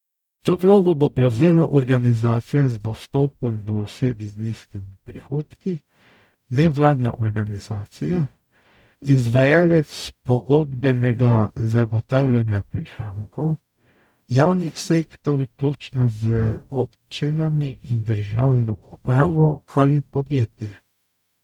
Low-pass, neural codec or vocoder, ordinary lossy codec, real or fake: 19.8 kHz; codec, 44.1 kHz, 0.9 kbps, DAC; none; fake